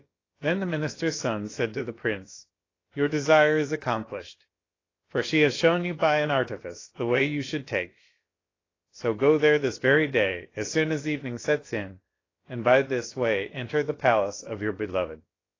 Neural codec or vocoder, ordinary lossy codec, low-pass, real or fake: codec, 16 kHz, about 1 kbps, DyCAST, with the encoder's durations; AAC, 32 kbps; 7.2 kHz; fake